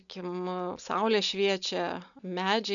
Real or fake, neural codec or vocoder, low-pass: real; none; 7.2 kHz